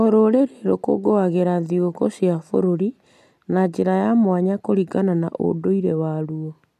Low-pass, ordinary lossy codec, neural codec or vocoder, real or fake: 14.4 kHz; none; none; real